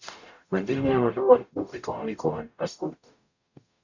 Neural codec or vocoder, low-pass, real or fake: codec, 44.1 kHz, 0.9 kbps, DAC; 7.2 kHz; fake